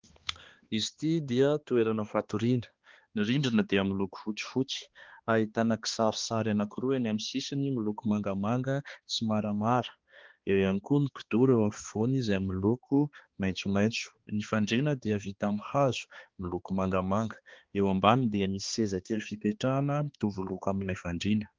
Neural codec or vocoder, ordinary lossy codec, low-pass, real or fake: codec, 16 kHz, 2 kbps, X-Codec, HuBERT features, trained on balanced general audio; Opus, 16 kbps; 7.2 kHz; fake